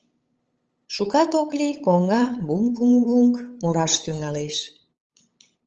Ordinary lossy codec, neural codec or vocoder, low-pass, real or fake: Opus, 24 kbps; codec, 16 kHz, 8 kbps, FunCodec, trained on LibriTTS, 25 frames a second; 7.2 kHz; fake